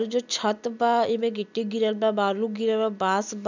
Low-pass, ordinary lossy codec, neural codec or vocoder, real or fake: 7.2 kHz; none; none; real